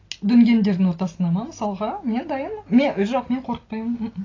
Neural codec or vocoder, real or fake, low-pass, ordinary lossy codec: none; real; 7.2 kHz; AAC, 32 kbps